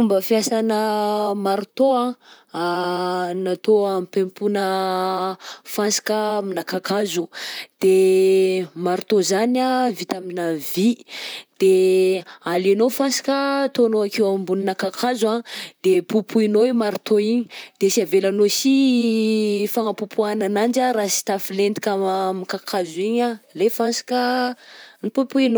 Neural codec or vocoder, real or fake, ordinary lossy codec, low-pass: vocoder, 44.1 kHz, 128 mel bands, Pupu-Vocoder; fake; none; none